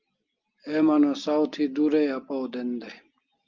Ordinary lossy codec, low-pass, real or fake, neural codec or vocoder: Opus, 32 kbps; 7.2 kHz; real; none